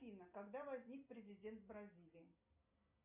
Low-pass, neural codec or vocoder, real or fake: 3.6 kHz; none; real